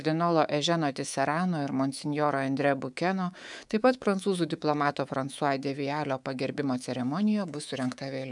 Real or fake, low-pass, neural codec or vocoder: fake; 10.8 kHz; codec, 24 kHz, 3.1 kbps, DualCodec